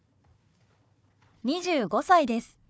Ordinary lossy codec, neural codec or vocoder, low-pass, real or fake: none; codec, 16 kHz, 4 kbps, FunCodec, trained on Chinese and English, 50 frames a second; none; fake